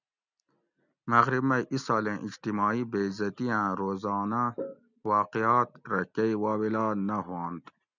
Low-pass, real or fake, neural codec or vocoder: 7.2 kHz; real; none